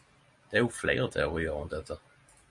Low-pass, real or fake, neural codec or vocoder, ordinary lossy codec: 10.8 kHz; real; none; MP3, 48 kbps